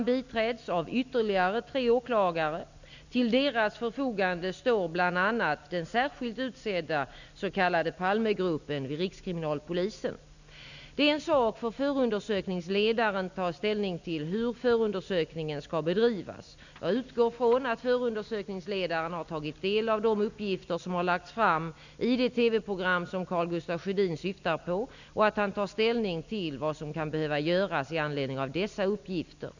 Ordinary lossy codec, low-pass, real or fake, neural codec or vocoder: none; 7.2 kHz; real; none